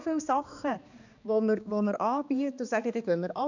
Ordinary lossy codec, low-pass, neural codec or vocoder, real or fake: none; 7.2 kHz; codec, 16 kHz, 2 kbps, X-Codec, HuBERT features, trained on balanced general audio; fake